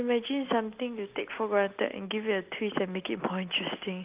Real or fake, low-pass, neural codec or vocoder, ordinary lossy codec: real; 3.6 kHz; none; Opus, 32 kbps